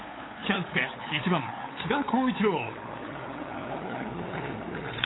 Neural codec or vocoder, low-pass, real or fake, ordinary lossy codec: codec, 16 kHz, 8 kbps, FunCodec, trained on LibriTTS, 25 frames a second; 7.2 kHz; fake; AAC, 16 kbps